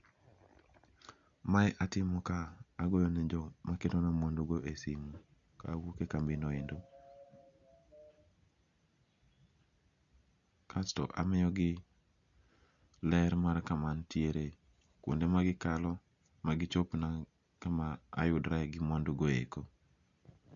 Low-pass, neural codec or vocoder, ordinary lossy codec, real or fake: 7.2 kHz; none; none; real